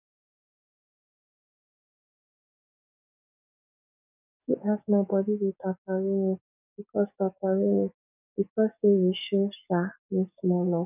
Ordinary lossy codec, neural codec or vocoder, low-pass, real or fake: none; codec, 44.1 kHz, 7.8 kbps, DAC; 3.6 kHz; fake